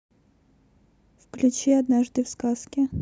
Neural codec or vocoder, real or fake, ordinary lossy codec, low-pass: none; real; none; none